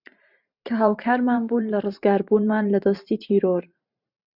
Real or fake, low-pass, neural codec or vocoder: fake; 5.4 kHz; vocoder, 44.1 kHz, 128 mel bands every 512 samples, BigVGAN v2